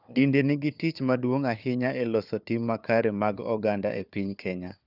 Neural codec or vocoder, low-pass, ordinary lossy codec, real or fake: codec, 16 kHz, 4 kbps, FunCodec, trained on Chinese and English, 50 frames a second; 5.4 kHz; none; fake